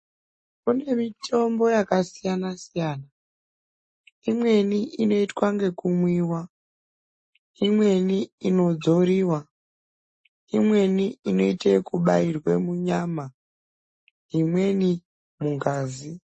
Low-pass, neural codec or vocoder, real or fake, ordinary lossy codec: 10.8 kHz; none; real; MP3, 32 kbps